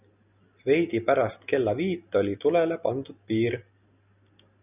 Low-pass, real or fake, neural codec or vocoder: 3.6 kHz; real; none